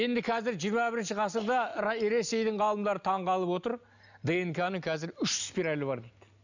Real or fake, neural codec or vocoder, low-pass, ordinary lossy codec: real; none; 7.2 kHz; none